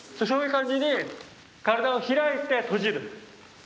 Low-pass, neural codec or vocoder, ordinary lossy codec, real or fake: none; none; none; real